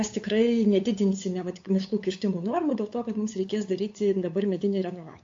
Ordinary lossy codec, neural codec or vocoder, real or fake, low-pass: AAC, 48 kbps; codec, 16 kHz, 8 kbps, FunCodec, trained on LibriTTS, 25 frames a second; fake; 7.2 kHz